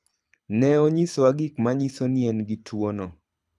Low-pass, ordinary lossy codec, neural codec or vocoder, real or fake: none; none; codec, 24 kHz, 6 kbps, HILCodec; fake